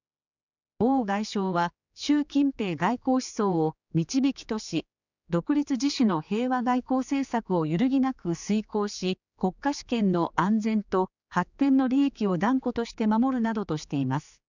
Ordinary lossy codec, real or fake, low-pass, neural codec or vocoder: none; fake; 7.2 kHz; codec, 16 kHz, 4 kbps, X-Codec, HuBERT features, trained on general audio